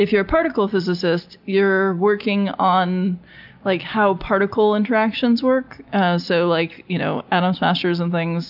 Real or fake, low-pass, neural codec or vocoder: real; 5.4 kHz; none